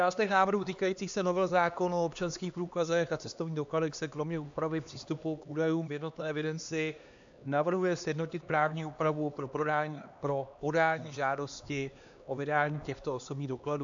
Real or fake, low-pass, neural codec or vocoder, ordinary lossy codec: fake; 7.2 kHz; codec, 16 kHz, 2 kbps, X-Codec, HuBERT features, trained on LibriSpeech; AAC, 64 kbps